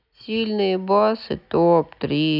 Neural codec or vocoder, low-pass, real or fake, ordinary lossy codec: none; 5.4 kHz; real; none